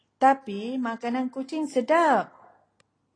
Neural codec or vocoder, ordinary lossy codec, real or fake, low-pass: none; AAC, 32 kbps; real; 9.9 kHz